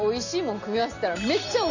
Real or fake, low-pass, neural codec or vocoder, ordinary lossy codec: real; 7.2 kHz; none; none